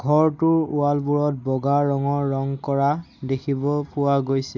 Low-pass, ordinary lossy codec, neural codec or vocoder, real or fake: 7.2 kHz; none; none; real